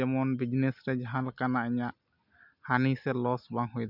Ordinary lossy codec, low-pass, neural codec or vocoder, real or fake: none; 5.4 kHz; none; real